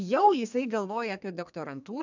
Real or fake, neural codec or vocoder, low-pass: fake; codec, 32 kHz, 1.9 kbps, SNAC; 7.2 kHz